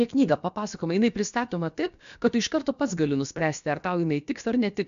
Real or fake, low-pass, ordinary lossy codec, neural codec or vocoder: fake; 7.2 kHz; MP3, 64 kbps; codec, 16 kHz, about 1 kbps, DyCAST, with the encoder's durations